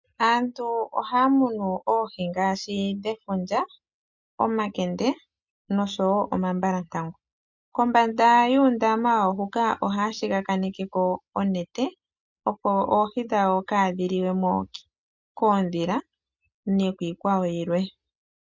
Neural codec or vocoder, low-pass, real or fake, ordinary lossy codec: none; 7.2 kHz; real; MP3, 64 kbps